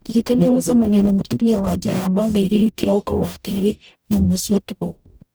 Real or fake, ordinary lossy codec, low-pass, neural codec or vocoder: fake; none; none; codec, 44.1 kHz, 0.9 kbps, DAC